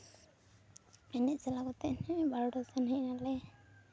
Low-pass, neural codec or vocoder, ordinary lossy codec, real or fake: none; none; none; real